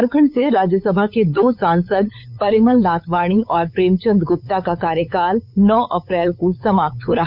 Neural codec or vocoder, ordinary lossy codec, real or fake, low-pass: codec, 16 kHz, 8 kbps, FunCodec, trained on LibriTTS, 25 frames a second; none; fake; 5.4 kHz